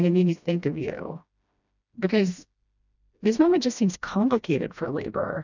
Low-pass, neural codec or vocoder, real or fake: 7.2 kHz; codec, 16 kHz, 1 kbps, FreqCodec, smaller model; fake